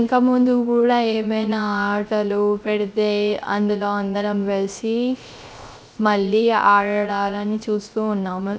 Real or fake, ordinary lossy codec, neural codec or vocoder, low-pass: fake; none; codec, 16 kHz, 0.3 kbps, FocalCodec; none